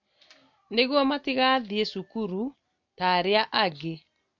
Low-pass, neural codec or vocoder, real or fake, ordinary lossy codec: 7.2 kHz; none; real; MP3, 64 kbps